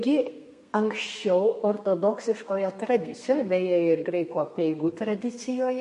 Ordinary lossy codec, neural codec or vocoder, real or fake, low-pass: MP3, 48 kbps; codec, 32 kHz, 1.9 kbps, SNAC; fake; 14.4 kHz